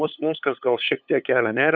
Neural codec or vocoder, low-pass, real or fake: codec, 16 kHz, 8 kbps, FunCodec, trained on LibriTTS, 25 frames a second; 7.2 kHz; fake